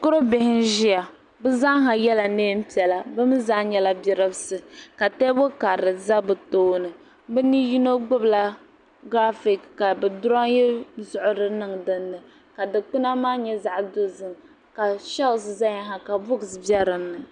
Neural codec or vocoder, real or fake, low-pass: none; real; 9.9 kHz